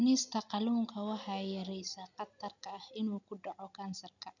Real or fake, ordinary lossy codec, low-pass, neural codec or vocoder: real; none; 7.2 kHz; none